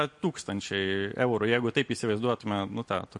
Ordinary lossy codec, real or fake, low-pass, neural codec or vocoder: MP3, 48 kbps; real; 10.8 kHz; none